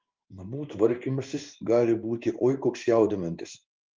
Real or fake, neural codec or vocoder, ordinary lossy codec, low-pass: fake; codec, 16 kHz in and 24 kHz out, 1 kbps, XY-Tokenizer; Opus, 32 kbps; 7.2 kHz